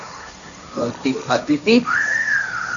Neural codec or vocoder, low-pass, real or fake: codec, 16 kHz, 1.1 kbps, Voila-Tokenizer; 7.2 kHz; fake